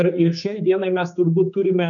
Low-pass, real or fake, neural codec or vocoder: 7.2 kHz; fake; codec, 16 kHz, 4 kbps, X-Codec, HuBERT features, trained on general audio